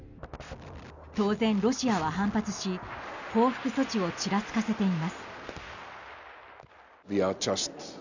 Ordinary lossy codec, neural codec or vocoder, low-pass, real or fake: none; none; 7.2 kHz; real